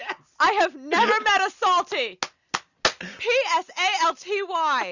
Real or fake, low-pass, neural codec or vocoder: real; 7.2 kHz; none